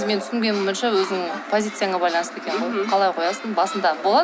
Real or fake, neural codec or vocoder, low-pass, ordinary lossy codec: real; none; none; none